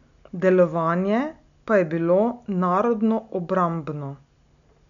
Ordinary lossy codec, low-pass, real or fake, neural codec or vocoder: none; 7.2 kHz; real; none